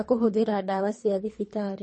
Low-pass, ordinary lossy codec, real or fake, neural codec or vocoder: 10.8 kHz; MP3, 32 kbps; fake; codec, 24 kHz, 3 kbps, HILCodec